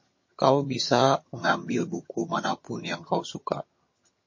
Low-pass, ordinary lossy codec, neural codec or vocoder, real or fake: 7.2 kHz; MP3, 32 kbps; vocoder, 22.05 kHz, 80 mel bands, HiFi-GAN; fake